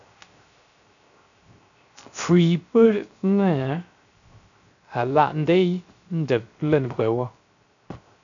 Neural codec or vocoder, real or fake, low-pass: codec, 16 kHz, 0.3 kbps, FocalCodec; fake; 7.2 kHz